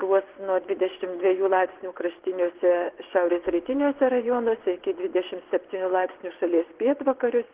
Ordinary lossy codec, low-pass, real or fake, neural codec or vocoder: Opus, 16 kbps; 3.6 kHz; fake; vocoder, 24 kHz, 100 mel bands, Vocos